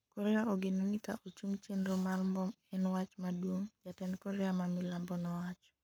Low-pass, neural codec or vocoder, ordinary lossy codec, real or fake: none; codec, 44.1 kHz, 7.8 kbps, Pupu-Codec; none; fake